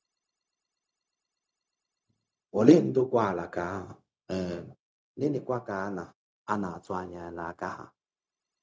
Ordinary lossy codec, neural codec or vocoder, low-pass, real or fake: none; codec, 16 kHz, 0.4 kbps, LongCat-Audio-Codec; none; fake